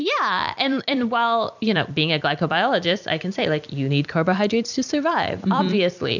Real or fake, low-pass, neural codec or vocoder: fake; 7.2 kHz; vocoder, 44.1 kHz, 128 mel bands every 256 samples, BigVGAN v2